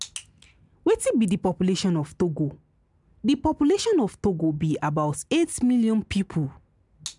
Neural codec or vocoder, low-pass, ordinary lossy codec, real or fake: none; 10.8 kHz; none; real